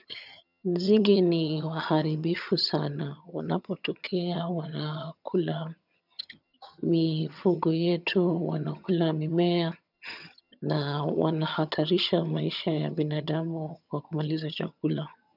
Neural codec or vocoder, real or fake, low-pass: vocoder, 22.05 kHz, 80 mel bands, HiFi-GAN; fake; 5.4 kHz